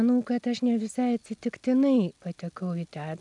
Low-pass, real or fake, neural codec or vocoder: 10.8 kHz; real; none